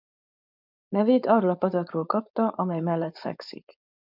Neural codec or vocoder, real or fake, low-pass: codec, 16 kHz, 4.8 kbps, FACodec; fake; 5.4 kHz